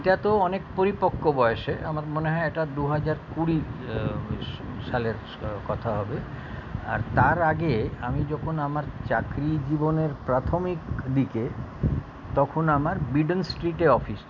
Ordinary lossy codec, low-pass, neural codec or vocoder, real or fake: none; 7.2 kHz; none; real